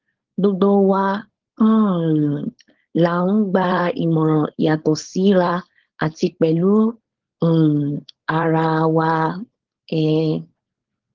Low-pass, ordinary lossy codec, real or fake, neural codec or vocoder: 7.2 kHz; Opus, 16 kbps; fake; codec, 16 kHz, 4.8 kbps, FACodec